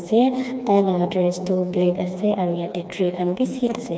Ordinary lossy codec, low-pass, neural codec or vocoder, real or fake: none; none; codec, 16 kHz, 2 kbps, FreqCodec, smaller model; fake